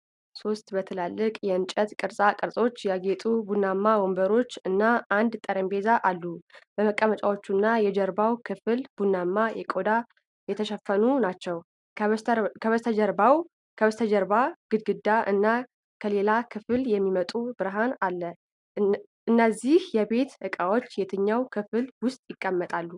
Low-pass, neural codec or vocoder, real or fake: 10.8 kHz; none; real